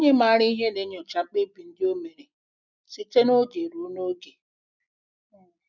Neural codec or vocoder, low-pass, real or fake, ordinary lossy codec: none; 7.2 kHz; real; none